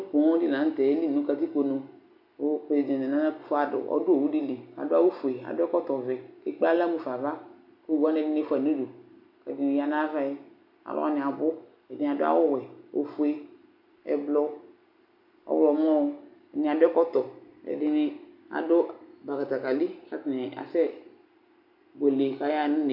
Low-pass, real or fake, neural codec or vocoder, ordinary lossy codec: 5.4 kHz; real; none; AAC, 48 kbps